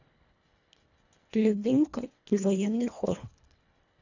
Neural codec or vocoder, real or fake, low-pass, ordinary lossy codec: codec, 24 kHz, 1.5 kbps, HILCodec; fake; 7.2 kHz; none